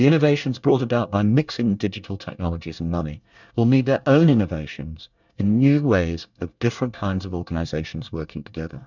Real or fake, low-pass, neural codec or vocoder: fake; 7.2 kHz; codec, 24 kHz, 1 kbps, SNAC